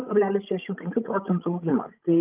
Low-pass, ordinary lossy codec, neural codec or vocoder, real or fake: 3.6 kHz; Opus, 32 kbps; codec, 16 kHz, 16 kbps, FreqCodec, larger model; fake